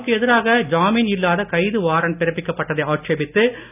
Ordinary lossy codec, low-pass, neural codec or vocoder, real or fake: none; 3.6 kHz; none; real